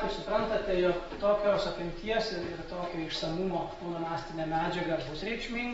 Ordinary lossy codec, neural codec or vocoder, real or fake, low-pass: AAC, 24 kbps; none; real; 19.8 kHz